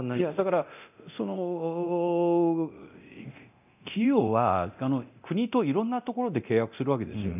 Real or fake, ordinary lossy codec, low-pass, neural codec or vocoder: fake; none; 3.6 kHz; codec, 24 kHz, 0.9 kbps, DualCodec